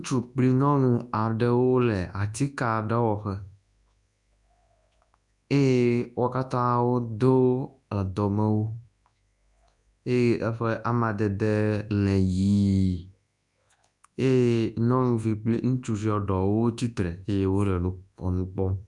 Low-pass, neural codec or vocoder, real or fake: 10.8 kHz; codec, 24 kHz, 0.9 kbps, WavTokenizer, large speech release; fake